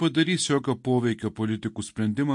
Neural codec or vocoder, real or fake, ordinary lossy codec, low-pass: none; real; MP3, 48 kbps; 10.8 kHz